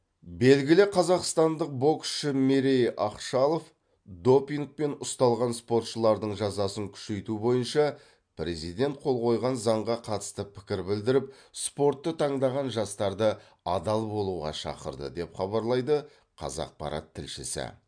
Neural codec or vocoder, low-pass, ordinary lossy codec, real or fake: none; 9.9 kHz; MP3, 64 kbps; real